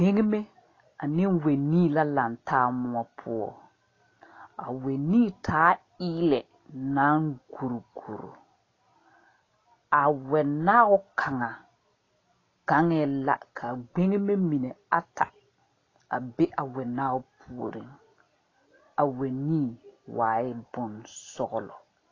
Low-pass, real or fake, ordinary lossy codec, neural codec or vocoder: 7.2 kHz; real; AAC, 32 kbps; none